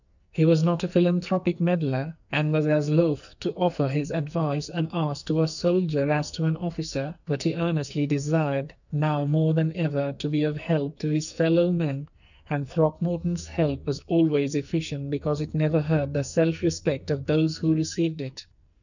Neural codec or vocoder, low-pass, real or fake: codec, 44.1 kHz, 2.6 kbps, SNAC; 7.2 kHz; fake